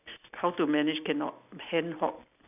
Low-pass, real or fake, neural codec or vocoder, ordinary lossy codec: 3.6 kHz; real; none; none